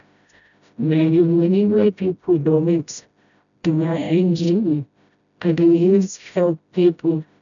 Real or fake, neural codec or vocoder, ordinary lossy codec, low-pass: fake; codec, 16 kHz, 0.5 kbps, FreqCodec, smaller model; none; 7.2 kHz